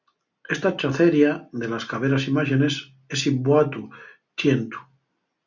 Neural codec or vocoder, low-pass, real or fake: none; 7.2 kHz; real